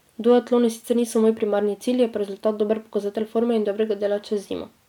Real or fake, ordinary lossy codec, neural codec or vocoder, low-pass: real; none; none; 19.8 kHz